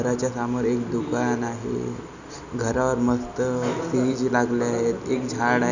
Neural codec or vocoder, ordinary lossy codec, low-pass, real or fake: none; none; 7.2 kHz; real